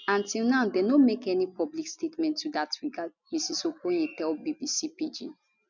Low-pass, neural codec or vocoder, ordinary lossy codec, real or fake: 7.2 kHz; none; none; real